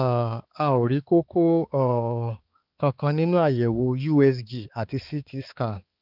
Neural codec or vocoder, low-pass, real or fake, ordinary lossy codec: codec, 16 kHz, 4 kbps, X-Codec, HuBERT features, trained on balanced general audio; 5.4 kHz; fake; Opus, 24 kbps